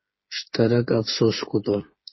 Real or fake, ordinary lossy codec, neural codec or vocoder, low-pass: fake; MP3, 24 kbps; codec, 16 kHz, 8 kbps, FreqCodec, smaller model; 7.2 kHz